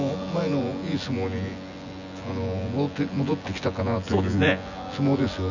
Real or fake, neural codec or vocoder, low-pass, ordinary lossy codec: fake; vocoder, 24 kHz, 100 mel bands, Vocos; 7.2 kHz; none